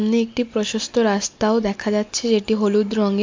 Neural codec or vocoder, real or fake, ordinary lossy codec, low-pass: none; real; MP3, 48 kbps; 7.2 kHz